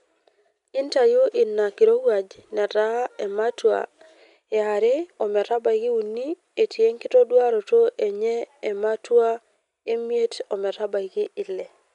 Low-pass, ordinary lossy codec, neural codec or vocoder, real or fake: 10.8 kHz; MP3, 96 kbps; none; real